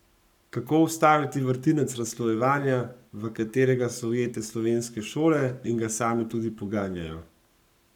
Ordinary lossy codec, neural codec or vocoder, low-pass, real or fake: none; codec, 44.1 kHz, 7.8 kbps, Pupu-Codec; 19.8 kHz; fake